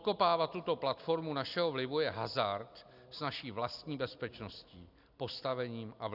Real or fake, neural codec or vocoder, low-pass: real; none; 5.4 kHz